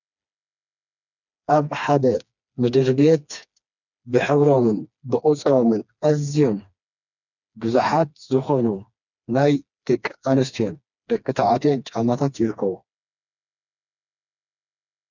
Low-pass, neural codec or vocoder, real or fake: 7.2 kHz; codec, 16 kHz, 2 kbps, FreqCodec, smaller model; fake